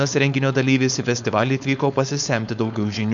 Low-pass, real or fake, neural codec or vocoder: 7.2 kHz; fake; codec, 16 kHz, 4.8 kbps, FACodec